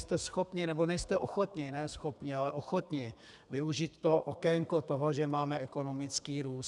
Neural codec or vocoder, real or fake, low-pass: codec, 32 kHz, 1.9 kbps, SNAC; fake; 10.8 kHz